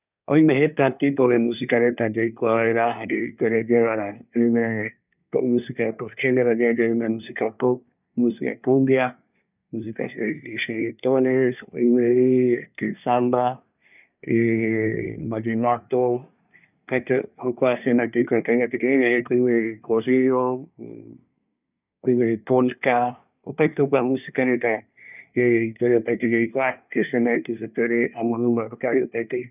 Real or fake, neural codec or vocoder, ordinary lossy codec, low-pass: fake; codec, 24 kHz, 1 kbps, SNAC; none; 3.6 kHz